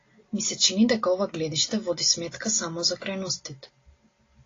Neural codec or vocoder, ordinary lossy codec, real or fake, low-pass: none; AAC, 32 kbps; real; 7.2 kHz